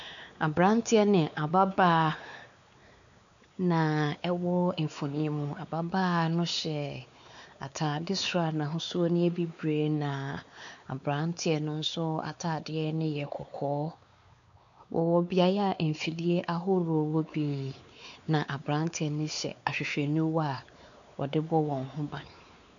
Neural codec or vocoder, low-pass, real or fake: codec, 16 kHz, 4 kbps, X-Codec, WavLM features, trained on Multilingual LibriSpeech; 7.2 kHz; fake